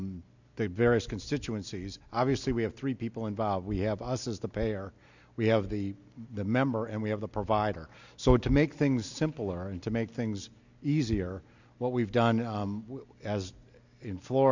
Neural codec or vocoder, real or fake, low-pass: none; real; 7.2 kHz